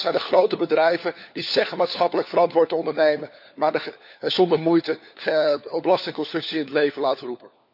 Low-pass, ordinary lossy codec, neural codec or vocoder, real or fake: 5.4 kHz; none; codec, 16 kHz, 4 kbps, FunCodec, trained on LibriTTS, 50 frames a second; fake